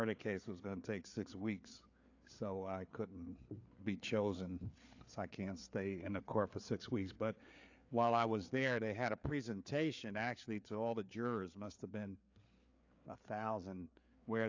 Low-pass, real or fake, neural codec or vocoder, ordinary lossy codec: 7.2 kHz; fake; codec, 16 kHz, 4 kbps, FreqCodec, larger model; AAC, 48 kbps